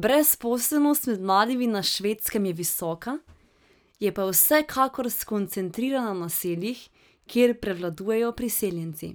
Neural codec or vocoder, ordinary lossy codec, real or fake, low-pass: none; none; real; none